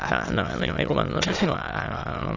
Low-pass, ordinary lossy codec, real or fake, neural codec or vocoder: 7.2 kHz; AAC, 32 kbps; fake; autoencoder, 22.05 kHz, a latent of 192 numbers a frame, VITS, trained on many speakers